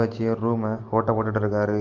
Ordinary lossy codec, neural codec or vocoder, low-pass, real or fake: Opus, 32 kbps; none; 7.2 kHz; real